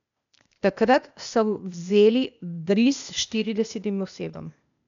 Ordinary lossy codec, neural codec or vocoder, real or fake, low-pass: MP3, 96 kbps; codec, 16 kHz, 0.8 kbps, ZipCodec; fake; 7.2 kHz